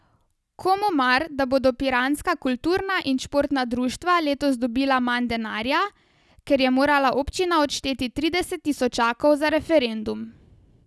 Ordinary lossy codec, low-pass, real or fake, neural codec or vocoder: none; none; real; none